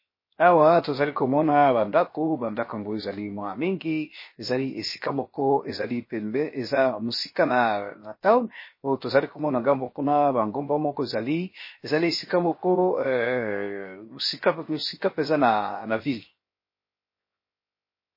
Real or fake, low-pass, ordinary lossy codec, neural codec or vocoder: fake; 5.4 kHz; MP3, 24 kbps; codec, 16 kHz, 0.7 kbps, FocalCodec